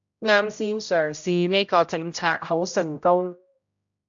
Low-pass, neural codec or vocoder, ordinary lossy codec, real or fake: 7.2 kHz; codec, 16 kHz, 0.5 kbps, X-Codec, HuBERT features, trained on general audio; AAC, 64 kbps; fake